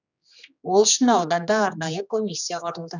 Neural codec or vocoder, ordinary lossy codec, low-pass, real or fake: codec, 16 kHz, 2 kbps, X-Codec, HuBERT features, trained on general audio; none; 7.2 kHz; fake